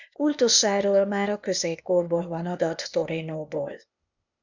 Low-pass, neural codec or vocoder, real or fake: 7.2 kHz; codec, 16 kHz, 0.8 kbps, ZipCodec; fake